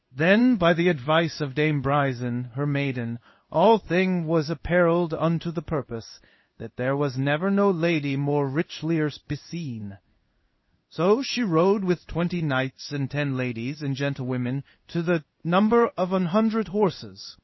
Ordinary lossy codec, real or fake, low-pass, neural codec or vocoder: MP3, 24 kbps; fake; 7.2 kHz; codec, 16 kHz in and 24 kHz out, 1 kbps, XY-Tokenizer